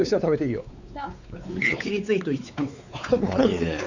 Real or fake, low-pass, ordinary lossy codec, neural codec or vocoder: fake; 7.2 kHz; none; codec, 16 kHz, 4 kbps, X-Codec, WavLM features, trained on Multilingual LibriSpeech